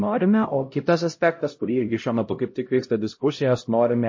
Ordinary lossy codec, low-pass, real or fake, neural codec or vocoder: MP3, 32 kbps; 7.2 kHz; fake; codec, 16 kHz, 0.5 kbps, X-Codec, HuBERT features, trained on LibriSpeech